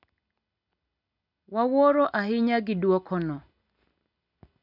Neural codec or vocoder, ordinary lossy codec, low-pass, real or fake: none; none; 5.4 kHz; real